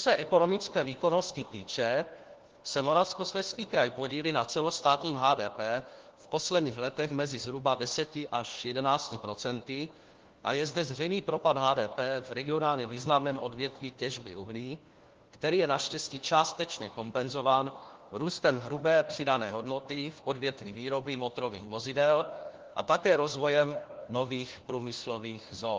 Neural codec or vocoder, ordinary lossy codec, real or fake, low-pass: codec, 16 kHz, 1 kbps, FunCodec, trained on LibriTTS, 50 frames a second; Opus, 16 kbps; fake; 7.2 kHz